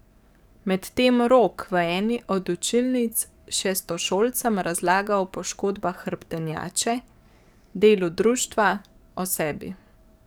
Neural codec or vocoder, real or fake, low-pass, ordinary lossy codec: codec, 44.1 kHz, 7.8 kbps, DAC; fake; none; none